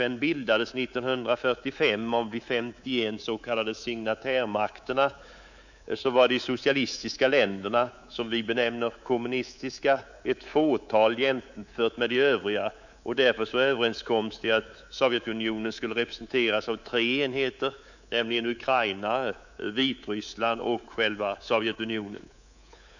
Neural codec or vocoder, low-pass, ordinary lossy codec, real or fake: codec, 24 kHz, 3.1 kbps, DualCodec; 7.2 kHz; none; fake